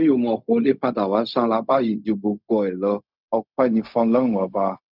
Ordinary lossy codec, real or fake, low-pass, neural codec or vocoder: none; fake; 5.4 kHz; codec, 16 kHz, 0.4 kbps, LongCat-Audio-Codec